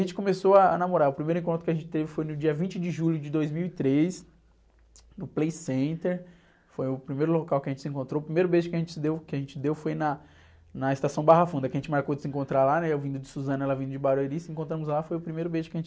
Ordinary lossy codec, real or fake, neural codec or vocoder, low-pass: none; real; none; none